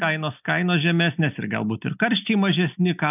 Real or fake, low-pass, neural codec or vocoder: real; 3.6 kHz; none